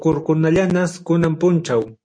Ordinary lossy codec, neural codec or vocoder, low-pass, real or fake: MP3, 48 kbps; none; 9.9 kHz; real